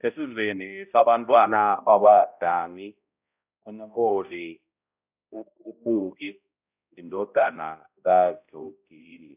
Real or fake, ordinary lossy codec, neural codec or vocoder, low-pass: fake; none; codec, 16 kHz, 0.5 kbps, X-Codec, HuBERT features, trained on balanced general audio; 3.6 kHz